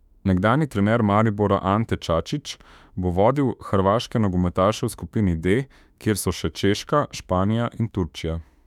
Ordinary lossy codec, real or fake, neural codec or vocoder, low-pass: none; fake; autoencoder, 48 kHz, 32 numbers a frame, DAC-VAE, trained on Japanese speech; 19.8 kHz